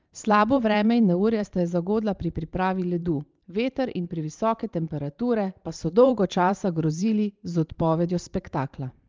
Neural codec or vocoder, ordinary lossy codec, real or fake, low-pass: vocoder, 44.1 kHz, 128 mel bands every 512 samples, BigVGAN v2; Opus, 32 kbps; fake; 7.2 kHz